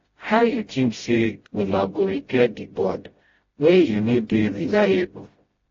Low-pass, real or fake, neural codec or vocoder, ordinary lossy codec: 7.2 kHz; fake; codec, 16 kHz, 0.5 kbps, FreqCodec, smaller model; AAC, 24 kbps